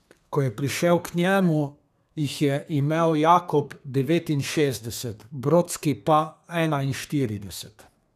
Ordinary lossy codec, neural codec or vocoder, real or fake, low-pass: none; codec, 32 kHz, 1.9 kbps, SNAC; fake; 14.4 kHz